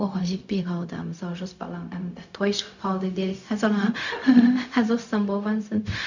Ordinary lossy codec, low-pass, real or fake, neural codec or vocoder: none; 7.2 kHz; fake; codec, 16 kHz, 0.4 kbps, LongCat-Audio-Codec